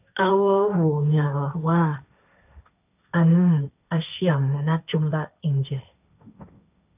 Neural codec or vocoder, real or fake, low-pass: codec, 16 kHz, 1.1 kbps, Voila-Tokenizer; fake; 3.6 kHz